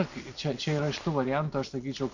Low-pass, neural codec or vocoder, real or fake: 7.2 kHz; none; real